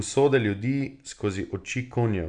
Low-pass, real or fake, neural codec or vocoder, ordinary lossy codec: 9.9 kHz; real; none; none